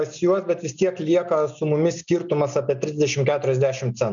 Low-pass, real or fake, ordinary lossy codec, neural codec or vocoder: 7.2 kHz; real; MP3, 96 kbps; none